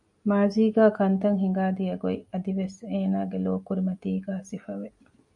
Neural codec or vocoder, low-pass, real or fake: none; 10.8 kHz; real